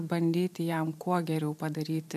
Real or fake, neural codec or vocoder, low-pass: fake; vocoder, 44.1 kHz, 128 mel bands every 512 samples, BigVGAN v2; 14.4 kHz